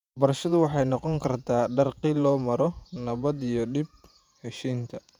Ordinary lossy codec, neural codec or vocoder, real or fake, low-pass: none; vocoder, 48 kHz, 128 mel bands, Vocos; fake; 19.8 kHz